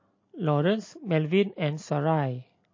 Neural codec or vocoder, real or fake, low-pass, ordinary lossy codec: none; real; 7.2 kHz; MP3, 32 kbps